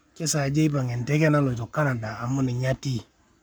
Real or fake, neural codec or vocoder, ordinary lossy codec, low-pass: fake; codec, 44.1 kHz, 7.8 kbps, Pupu-Codec; none; none